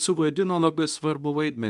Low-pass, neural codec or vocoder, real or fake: 10.8 kHz; codec, 24 kHz, 0.9 kbps, WavTokenizer, medium speech release version 2; fake